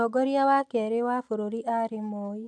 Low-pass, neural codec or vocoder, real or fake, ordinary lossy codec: none; none; real; none